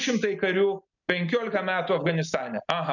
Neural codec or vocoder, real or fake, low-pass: none; real; 7.2 kHz